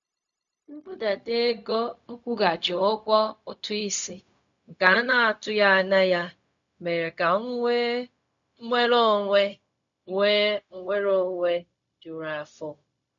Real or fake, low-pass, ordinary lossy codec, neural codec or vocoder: fake; 7.2 kHz; none; codec, 16 kHz, 0.4 kbps, LongCat-Audio-Codec